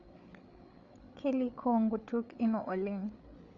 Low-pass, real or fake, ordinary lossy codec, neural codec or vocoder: 7.2 kHz; fake; none; codec, 16 kHz, 8 kbps, FreqCodec, larger model